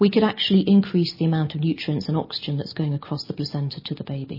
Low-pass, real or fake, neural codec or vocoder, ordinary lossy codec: 5.4 kHz; real; none; MP3, 24 kbps